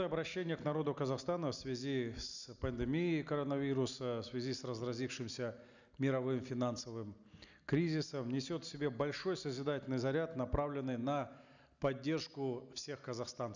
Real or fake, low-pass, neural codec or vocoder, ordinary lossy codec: real; 7.2 kHz; none; none